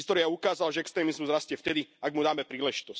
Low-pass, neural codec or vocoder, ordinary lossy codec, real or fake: none; none; none; real